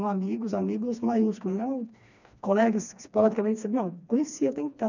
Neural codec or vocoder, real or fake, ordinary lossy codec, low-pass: codec, 16 kHz, 2 kbps, FreqCodec, smaller model; fake; none; 7.2 kHz